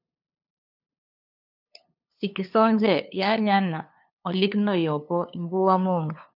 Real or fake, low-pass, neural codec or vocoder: fake; 5.4 kHz; codec, 16 kHz, 2 kbps, FunCodec, trained on LibriTTS, 25 frames a second